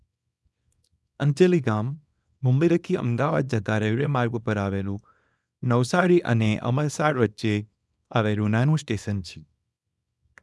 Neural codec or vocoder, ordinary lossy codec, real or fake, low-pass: codec, 24 kHz, 0.9 kbps, WavTokenizer, small release; none; fake; none